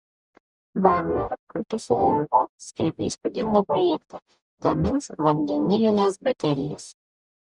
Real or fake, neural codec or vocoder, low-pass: fake; codec, 44.1 kHz, 0.9 kbps, DAC; 10.8 kHz